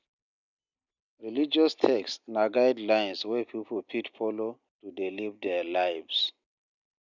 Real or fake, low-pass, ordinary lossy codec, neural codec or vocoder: real; 7.2 kHz; none; none